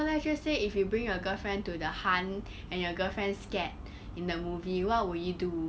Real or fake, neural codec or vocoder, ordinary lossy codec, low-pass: real; none; none; none